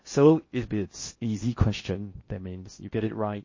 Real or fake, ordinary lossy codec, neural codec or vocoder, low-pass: fake; MP3, 32 kbps; codec, 16 kHz in and 24 kHz out, 0.6 kbps, FocalCodec, streaming, 4096 codes; 7.2 kHz